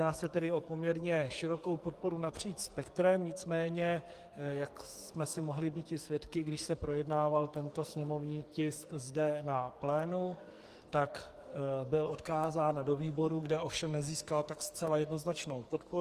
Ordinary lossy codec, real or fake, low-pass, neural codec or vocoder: Opus, 32 kbps; fake; 14.4 kHz; codec, 44.1 kHz, 2.6 kbps, SNAC